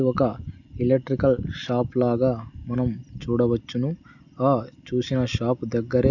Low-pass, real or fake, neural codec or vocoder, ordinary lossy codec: 7.2 kHz; real; none; none